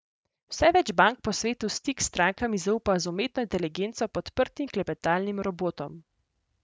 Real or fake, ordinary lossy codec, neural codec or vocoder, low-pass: real; none; none; none